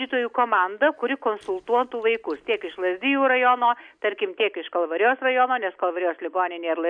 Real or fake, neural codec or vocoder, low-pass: real; none; 9.9 kHz